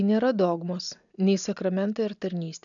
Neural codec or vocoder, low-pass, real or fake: none; 7.2 kHz; real